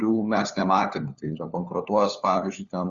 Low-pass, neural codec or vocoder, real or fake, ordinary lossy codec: 7.2 kHz; codec, 16 kHz, 4 kbps, FunCodec, trained on LibriTTS, 50 frames a second; fake; Opus, 64 kbps